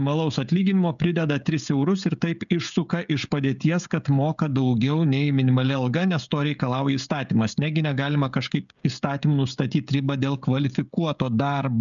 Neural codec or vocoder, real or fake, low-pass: codec, 16 kHz, 16 kbps, FreqCodec, smaller model; fake; 7.2 kHz